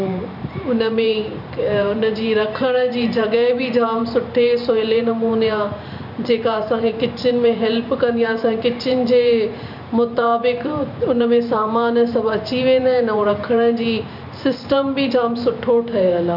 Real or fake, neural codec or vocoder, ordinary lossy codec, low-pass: fake; vocoder, 44.1 kHz, 128 mel bands every 512 samples, BigVGAN v2; none; 5.4 kHz